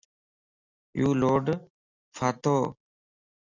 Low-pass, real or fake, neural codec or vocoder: 7.2 kHz; real; none